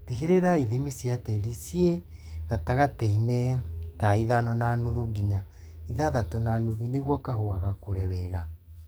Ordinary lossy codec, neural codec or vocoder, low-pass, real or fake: none; codec, 44.1 kHz, 2.6 kbps, SNAC; none; fake